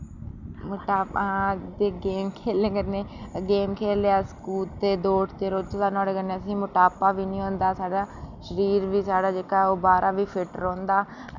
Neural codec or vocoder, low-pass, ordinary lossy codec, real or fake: none; 7.2 kHz; none; real